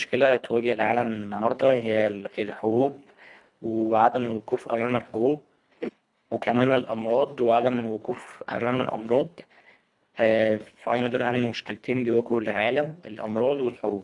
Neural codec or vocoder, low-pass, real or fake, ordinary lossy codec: codec, 24 kHz, 1.5 kbps, HILCodec; 10.8 kHz; fake; none